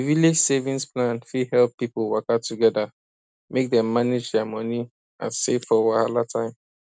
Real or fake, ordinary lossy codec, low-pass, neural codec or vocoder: real; none; none; none